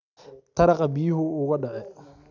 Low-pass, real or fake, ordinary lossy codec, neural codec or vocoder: 7.2 kHz; real; none; none